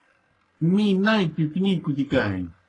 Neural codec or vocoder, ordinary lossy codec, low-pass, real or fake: codec, 44.1 kHz, 3.4 kbps, Pupu-Codec; AAC, 32 kbps; 10.8 kHz; fake